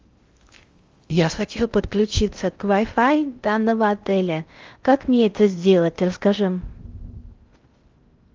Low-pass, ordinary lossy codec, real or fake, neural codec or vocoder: 7.2 kHz; Opus, 32 kbps; fake; codec, 16 kHz in and 24 kHz out, 0.6 kbps, FocalCodec, streaming, 2048 codes